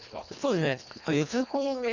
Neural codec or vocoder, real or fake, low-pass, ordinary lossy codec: codec, 24 kHz, 1.5 kbps, HILCodec; fake; 7.2 kHz; Opus, 64 kbps